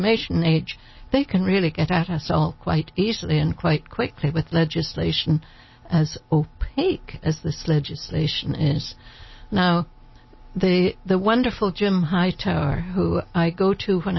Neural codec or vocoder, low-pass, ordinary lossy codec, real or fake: none; 7.2 kHz; MP3, 24 kbps; real